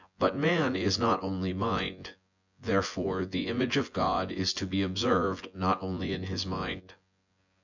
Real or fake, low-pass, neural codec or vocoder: fake; 7.2 kHz; vocoder, 24 kHz, 100 mel bands, Vocos